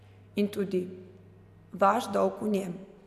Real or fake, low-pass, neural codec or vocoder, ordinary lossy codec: real; 14.4 kHz; none; none